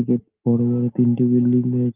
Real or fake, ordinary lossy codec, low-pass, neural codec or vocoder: real; Opus, 32 kbps; 3.6 kHz; none